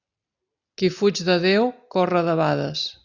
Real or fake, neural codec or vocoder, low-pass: real; none; 7.2 kHz